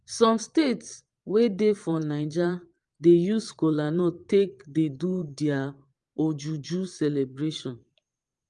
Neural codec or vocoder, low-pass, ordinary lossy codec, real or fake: vocoder, 22.05 kHz, 80 mel bands, Vocos; 9.9 kHz; Opus, 32 kbps; fake